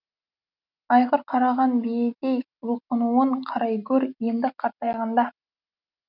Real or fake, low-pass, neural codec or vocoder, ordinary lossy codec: real; 5.4 kHz; none; none